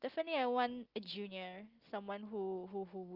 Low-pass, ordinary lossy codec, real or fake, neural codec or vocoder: 5.4 kHz; Opus, 32 kbps; real; none